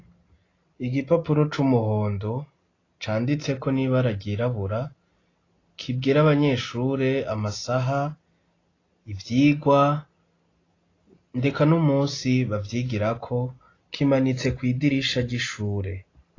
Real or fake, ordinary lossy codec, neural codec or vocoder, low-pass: real; AAC, 32 kbps; none; 7.2 kHz